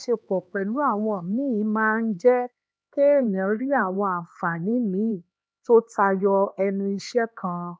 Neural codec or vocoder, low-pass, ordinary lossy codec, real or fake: codec, 16 kHz, 2 kbps, X-Codec, HuBERT features, trained on LibriSpeech; none; none; fake